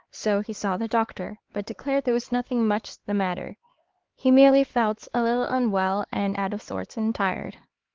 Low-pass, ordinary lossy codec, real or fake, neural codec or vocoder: 7.2 kHz; Opus, 16 kbps; fake; codec, 16 kHz, 4 kbps, X-Codec, HuBERT features, trained on LibriSpeech